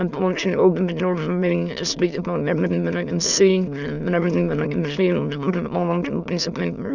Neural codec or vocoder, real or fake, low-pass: autoencoder, 22.05 kHz, a latent of 192 numbers a frame, VITS, trained on many speakers; fake; 7.2 kHz